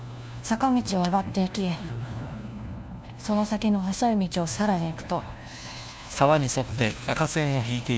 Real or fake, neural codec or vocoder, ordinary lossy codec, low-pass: fake; codec, 16 kHz, 1 kbps, FunCodec, trained on LibriTTS, 50 frames a second; none; none